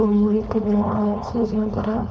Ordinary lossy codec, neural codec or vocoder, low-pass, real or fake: none; codec, 16 kHz, 4.8 kbps, FACodec; none; fake